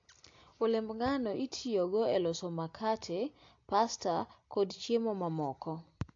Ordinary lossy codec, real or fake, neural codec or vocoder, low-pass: AAC, 48 kbps; real; none; 7.2 kHz